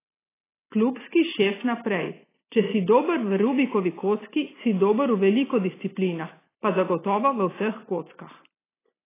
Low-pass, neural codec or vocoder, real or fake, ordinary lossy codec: 3.6 kHz; none; real; AAC, 16 kbps